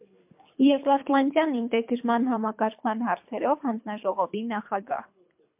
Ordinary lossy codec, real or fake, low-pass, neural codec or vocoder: MP3, 32 kbps; fake; 3.6 kHz; codec, 24 kHz, 3 kbps, HILCodec